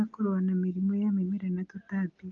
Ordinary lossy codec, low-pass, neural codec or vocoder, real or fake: Opus, 32 kbps; 7.2 kHz; none; real